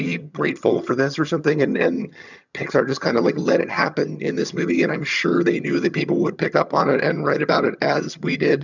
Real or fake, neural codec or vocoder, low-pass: fake; vocoder, 22.05 kHz, 80 mel bands, HiFi-GAN; 7.2 kHz